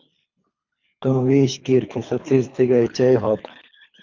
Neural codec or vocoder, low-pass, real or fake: codec, 24 kHz, 3 kbps, HILCodec; 7.2 kHz; fake